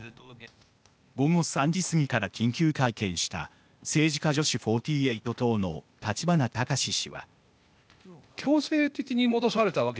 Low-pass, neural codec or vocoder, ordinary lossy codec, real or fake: none; codec, 16 kHz, 0.8 kbps, ZipCodec; none; fake